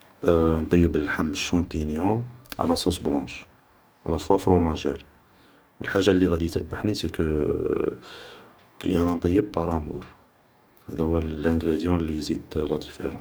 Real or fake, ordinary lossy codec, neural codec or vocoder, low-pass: fake; none; codec, 44.1 kHz, 2.6 kbps, DAC; none